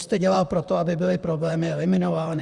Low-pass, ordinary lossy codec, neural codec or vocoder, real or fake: 10.8 kHz; Opus, 64 kbps; vocoder, 44.1 kHz, 128 mel bands, Pupu-Vocoder; fake